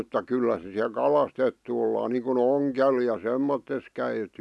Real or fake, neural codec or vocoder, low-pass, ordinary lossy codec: real; none; none; none